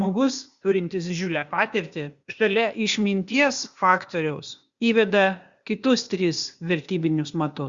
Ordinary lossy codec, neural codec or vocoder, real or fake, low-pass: Opus, 64 kbps; codec, 16 kHz, 0.8 kbps, ZipCodec; fake; 7.2 kHz